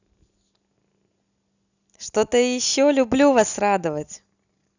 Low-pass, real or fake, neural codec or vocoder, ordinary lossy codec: 7.2 kHz; real; none; none